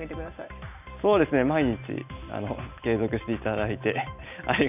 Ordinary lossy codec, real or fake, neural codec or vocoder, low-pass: none; real; none; 3.6 kHz